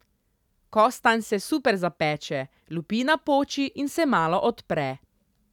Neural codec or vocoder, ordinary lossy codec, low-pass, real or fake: none; none; 19.8 kHz; real